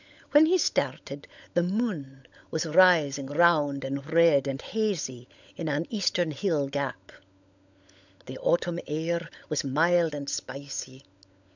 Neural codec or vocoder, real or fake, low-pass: codec, 16 kHz, 16 kbps, FunCodec, trained on LibriTTS, 50 frames a second; fake; 7.2 kHz